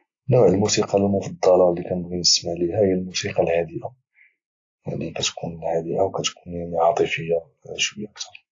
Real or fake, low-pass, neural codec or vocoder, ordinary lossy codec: real; 7.2 kHz; none; AAC, 48 kbps